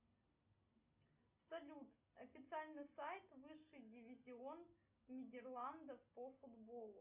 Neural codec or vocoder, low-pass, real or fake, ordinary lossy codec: none; 3.6 kHz; real; Opus, 64 kbps